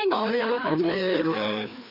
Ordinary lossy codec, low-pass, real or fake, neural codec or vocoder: none; 5.4 kHz; fake; codec, 16 kHz, 2 kbps, FreqCodec, larger model